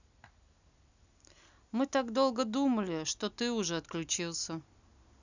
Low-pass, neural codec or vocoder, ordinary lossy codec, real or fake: 7.2 kHz; none; none; real